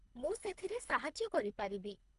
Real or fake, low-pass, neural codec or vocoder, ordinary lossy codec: fake; 10.8 kHz; codec, 24 kHz, 3 kbps, HILCodec; none